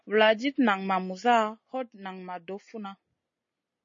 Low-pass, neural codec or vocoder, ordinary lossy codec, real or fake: 7.2 kHz; none; MP3, 32 kbps; real